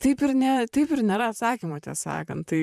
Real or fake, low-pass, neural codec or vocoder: fake; 14.4 kHz; vocoder, 44.1 kHz, 128 mel bands, Pupu-Vocoder